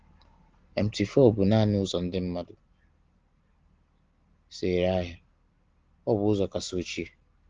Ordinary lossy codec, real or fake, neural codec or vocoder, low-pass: Opus, 16 kbps; real; none; 7.2 kHz